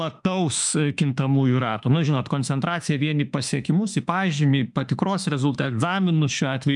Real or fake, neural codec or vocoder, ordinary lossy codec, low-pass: fake; autoencoder, 48 kHz, 32 numbers a frame, DAC-VAE, trained on Japanese speech; MP3, 96 kbps; 10.8 kHz